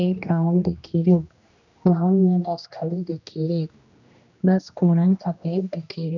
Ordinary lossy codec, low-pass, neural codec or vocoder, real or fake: none; 7.2 kHz; codec, 16 kHz, 1 kbps, X-Codec, HuBERT features, trained on general audio; fake